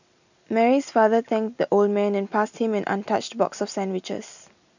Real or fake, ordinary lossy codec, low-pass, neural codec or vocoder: real; none; 7.2 kHz; none